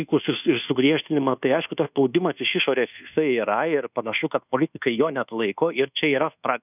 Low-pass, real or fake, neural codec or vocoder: 3.6 kHz; fake; codec, 24 kHz, 1.2 kbps, DualCodec